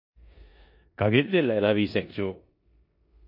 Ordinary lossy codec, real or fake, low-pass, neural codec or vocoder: MP3, 32 kbps; fake; 5.4 kHz; codec, 16 kHz in and 24 kHz out, 0.9 kbps, LongCat-Audio-Codec, four codebook decoder